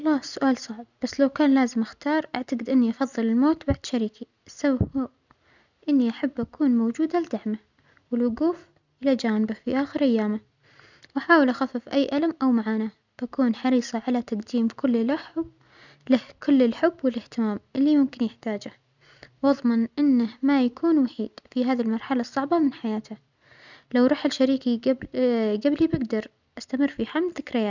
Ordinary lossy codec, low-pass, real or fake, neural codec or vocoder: none; 7.2 kHz; real; none